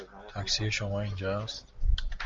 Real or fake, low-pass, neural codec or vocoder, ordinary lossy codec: real; 7.2 kHz; none; Opus, 32 kbps